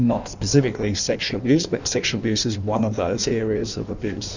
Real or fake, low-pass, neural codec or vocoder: fake; 7.2 kHz; codec, 16 kHz in and 24 kHz out, 1.1 kbps, FireRedTTS-2 codec